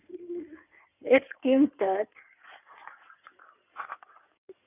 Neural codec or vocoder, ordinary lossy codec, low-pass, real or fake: codec, 16 kHz in and 24 kHz out, 2.2 kbps, FireRedTTS-2 codec; none; 3.6 kHz; fake